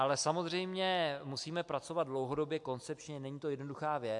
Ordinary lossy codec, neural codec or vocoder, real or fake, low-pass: MP3, 64 kbps; none; real; 10.8 kHz